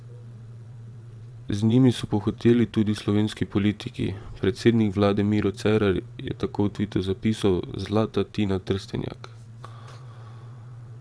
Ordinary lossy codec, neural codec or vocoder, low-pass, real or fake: none; vocoder, 22.05 kHz, 80 mel bands, WaveNeXt; none; fake